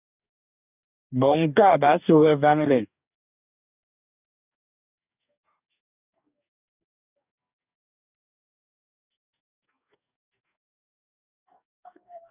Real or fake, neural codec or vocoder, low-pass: fake; codec, 44.1 kHz, 2.6 kbps, DAC; 3.6 kHz